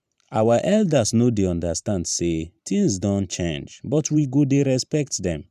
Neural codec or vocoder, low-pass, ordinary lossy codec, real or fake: vocoder, 44.1 kHz, 128 mel bands every 512 samples, BigVGAN v2; 14.4 kHz; none; fake